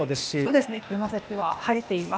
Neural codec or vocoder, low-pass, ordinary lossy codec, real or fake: codec, 16 kHz, 0.8 kbps, ZipCodec; none; none; fake